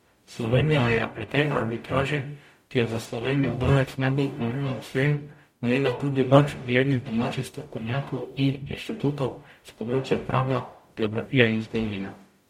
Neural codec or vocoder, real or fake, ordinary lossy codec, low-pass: codec, 44.1 kHz, 0.9 kbps, DAC; fake; MP3, 64 kbps; 19.8 kHz